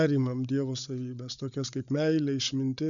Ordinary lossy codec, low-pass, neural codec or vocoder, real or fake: MP3, 96 kbps; 7.2 kHz; codec, 16 kHz, 8 kbps, FreqCodec, larger model; fake